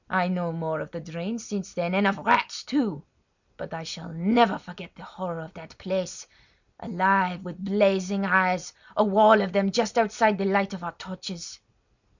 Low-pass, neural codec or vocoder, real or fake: 7.2 kHz; none; real